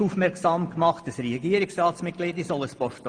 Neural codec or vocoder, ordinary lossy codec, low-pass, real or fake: none; Opus, 24 kbps; 9.9 kHz; real